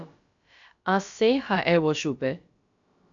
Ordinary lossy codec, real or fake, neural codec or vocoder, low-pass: AAC, 64 kbps; fake; codec, 16 kHz, about 1 kbps, DyCAST, with the encoder's durations; 7.2 kHz